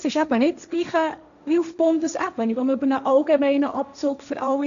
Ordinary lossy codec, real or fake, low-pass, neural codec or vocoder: none; fake; 7.2 kHz; codec, 16 kHz, 1.1 kbps, Voila-Tokenizer